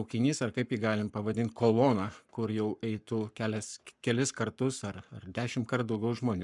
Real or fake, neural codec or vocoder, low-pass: fake; codec, 44.1 kHz, 7.8 kbps, Pupu-Codec; 10.8 kHz